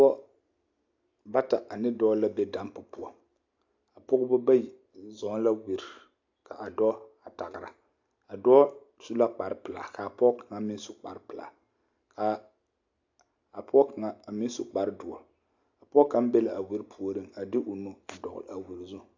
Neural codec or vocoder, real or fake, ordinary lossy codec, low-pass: none; real; AAC, 48 kbps; 7.2 kHz